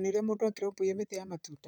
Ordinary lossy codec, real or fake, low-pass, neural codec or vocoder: none; fake; none; vocoder, 44.1 kHz, 128 mel bands, Pupu-Vocoder